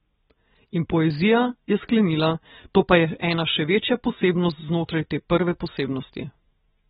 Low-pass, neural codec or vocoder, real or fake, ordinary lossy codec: 19.8 kHz; none; real; AAC, 16 kbps